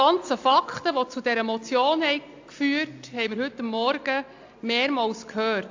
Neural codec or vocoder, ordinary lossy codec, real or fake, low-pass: none; AAC, 48 kbps; real; 7.2 kHz